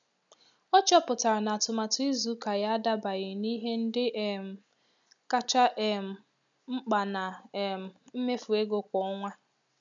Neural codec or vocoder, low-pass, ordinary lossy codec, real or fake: none; 7.2 kHz; none; real